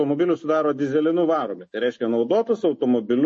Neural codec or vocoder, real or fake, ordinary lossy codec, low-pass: none; real; MP3, 32 kbps; 9.9 kHz